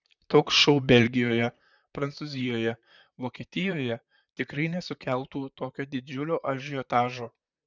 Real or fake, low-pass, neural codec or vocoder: fake; 7.2 kHz; vocoder, 44.1 kHz, 128 mel bands, Pupu-Vocoder